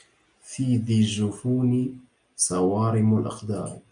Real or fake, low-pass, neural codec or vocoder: real; 9.9 kHz; none